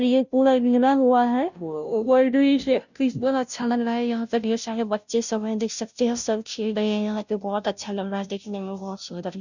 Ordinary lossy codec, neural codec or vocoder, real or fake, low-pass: none; codec, 16 kHz, 0.5 kbps, FunCodec, trained on Chinese and English, 25 frames a second; fake; 7.2 kHz